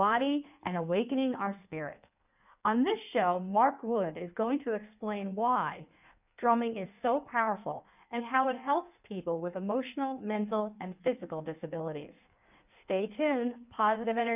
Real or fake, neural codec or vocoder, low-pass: fake; codec, 16 kHz in and 24 kHz out, 1.1 kbps, FireRedTTS-2 codec; 3.6 kHz